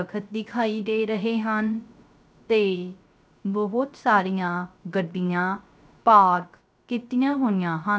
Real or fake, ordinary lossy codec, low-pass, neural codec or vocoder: fake; none; none; codec, 16 kHz, 0.3 kbps, FocalCodec